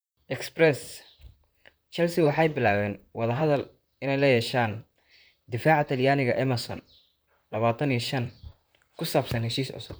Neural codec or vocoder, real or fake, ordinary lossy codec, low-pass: vocoder, 44.1 kHz, 128 mel bands, Pupu-Vocoder; fake; none; none